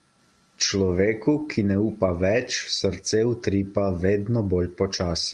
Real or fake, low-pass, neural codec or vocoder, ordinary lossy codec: real; 10.8 kHz; none; Opus, 32 kbps